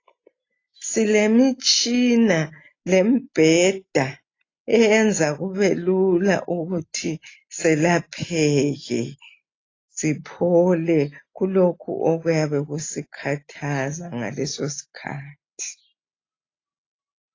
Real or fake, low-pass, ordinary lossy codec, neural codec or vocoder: real; 7.2 kHz; AAC, 32 kbps; none